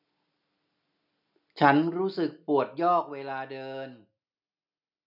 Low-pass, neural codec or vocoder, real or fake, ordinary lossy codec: 5.4 kHz; none; real; none